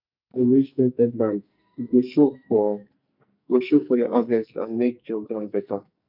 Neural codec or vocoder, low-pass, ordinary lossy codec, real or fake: codec, 44.1 kHz, 2.6 kbps, SNAC; 5.4 kHz; none; fake